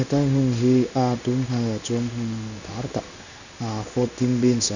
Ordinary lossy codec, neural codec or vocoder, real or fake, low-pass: none; codec, 16 kHz in and 24 kHz out, 1 kbps, XY-Tokenizer; fake; 7.2 kHz